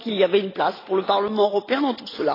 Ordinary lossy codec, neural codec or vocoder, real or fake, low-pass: AAC, 24 kbps; none; real; 5.4 kHz